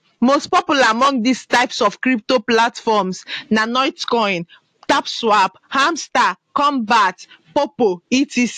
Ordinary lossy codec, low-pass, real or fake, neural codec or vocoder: AAC, 64 kbps; 14.4 kHz; real; none